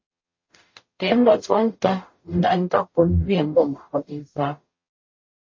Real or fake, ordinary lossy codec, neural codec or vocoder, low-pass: fake; MP3, 32 kbps; codec, 44.1 kHz, 0.9 kbps, DAC; 7.2 kHz